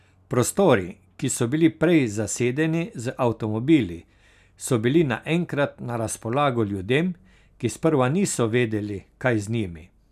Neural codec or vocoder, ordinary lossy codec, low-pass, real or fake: none; none; 14.4 kHz; real